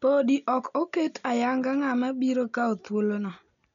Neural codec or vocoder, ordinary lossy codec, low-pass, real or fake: none; none; 7.2 kHz; real